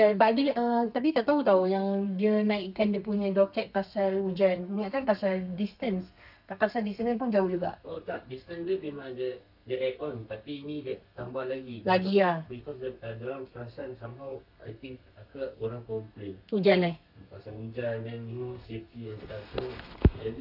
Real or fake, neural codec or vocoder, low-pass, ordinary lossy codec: fake; codec, 32 kHz, 1.9 kbps, SNAC; 5.4 kHz; none